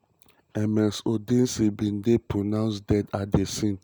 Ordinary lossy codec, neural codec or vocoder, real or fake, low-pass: none; none; real; none